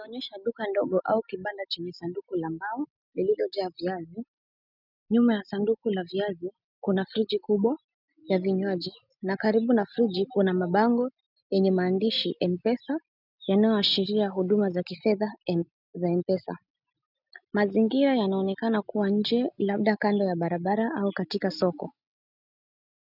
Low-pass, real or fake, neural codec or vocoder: 5.4 kHz; real; none